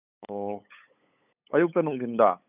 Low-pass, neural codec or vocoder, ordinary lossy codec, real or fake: 3.6 kHz; codec, 16 kHz, 8 kbps, FunCodec, trained on LibriTTS, 25 frames a second; none; fake